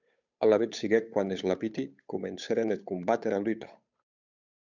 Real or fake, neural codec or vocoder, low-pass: fake; codec, 16 kHz, 8 kbps, FunCodec, trained on Chinese and English, 25 frames a second; 7.2 kHz